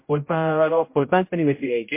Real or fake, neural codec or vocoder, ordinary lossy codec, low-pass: fake; codec, 16 kHz, 0.5 kbps, X-Codec, HuBERT features, trained on general audio; MP3, 24 kbps; 3.6 kHz